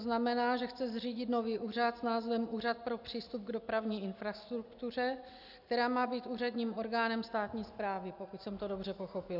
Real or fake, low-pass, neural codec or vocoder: real; 5.4 kHz; none